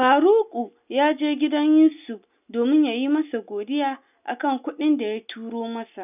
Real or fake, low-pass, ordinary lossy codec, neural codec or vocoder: real; 3.6 kHz; none; none